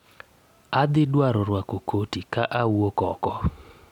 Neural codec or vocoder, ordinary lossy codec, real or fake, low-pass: none; MP3, 96 kbps; real; 19.8 kHz